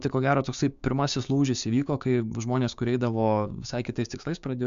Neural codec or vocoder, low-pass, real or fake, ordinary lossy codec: codec, 16 kHz, 6 kbps, DAC; 7.2 kHz; fake; MP3, 64 kbps